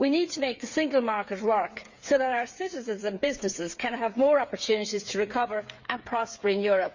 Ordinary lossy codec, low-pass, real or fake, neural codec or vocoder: Opus, 64 kbps; 7.2 kHz; fake; codec, 16 kHz, 8 kbps, FreqCodec, smaller model